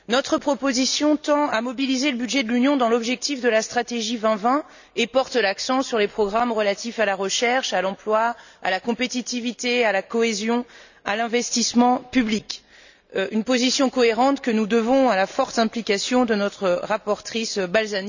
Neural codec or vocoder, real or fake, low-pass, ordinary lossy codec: none; real; 7.2 kHz; none